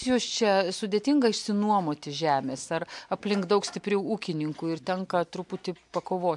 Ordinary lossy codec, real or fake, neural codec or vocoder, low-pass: MP3, 64 kbps; real; none; 10.8 kHz